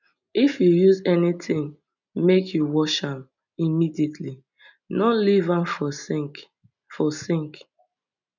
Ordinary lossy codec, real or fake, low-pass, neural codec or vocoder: none; real; 7.2 kHz; none